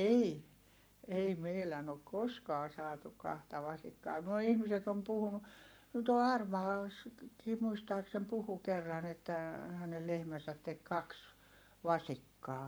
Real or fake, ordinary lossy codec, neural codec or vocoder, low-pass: fake; none; codec, 44.1 kHz, 7.8 kbps, Pupu-Codec; none